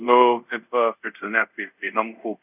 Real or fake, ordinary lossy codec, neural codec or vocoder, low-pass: fake; none; codec, 24 kHz, 0.5 kbps, DualCodec; 3.6 kHz